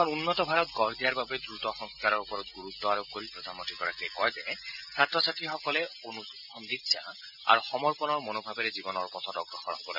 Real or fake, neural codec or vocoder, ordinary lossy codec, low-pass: real; none; none; 5.4 kHz